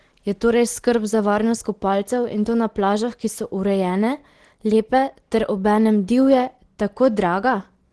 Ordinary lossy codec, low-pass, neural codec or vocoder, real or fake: Opus, 16 kbps; 10.8 kHz; none; real